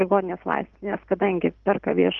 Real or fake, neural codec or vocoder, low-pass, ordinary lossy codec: real; none; 7.2 kHz; Opus, 32 kbps